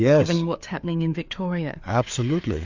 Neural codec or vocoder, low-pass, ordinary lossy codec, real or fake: codec, 16 kHz, 4 kbps, FreqCodec, larger model; 7.2 kHz; MP3, 64 kbps; fake